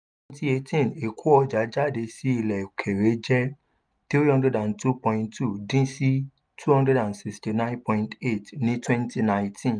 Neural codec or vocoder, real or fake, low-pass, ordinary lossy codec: none; real; 9.9 kHz; none